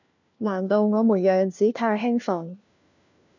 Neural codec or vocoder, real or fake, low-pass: codec, 16 kHz, 1 kbps, FunCodec, trained on LibriTTS, 50 frames a second; fake; 7.2 kHz